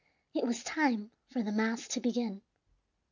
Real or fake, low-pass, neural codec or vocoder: real; 7.2 kHz; none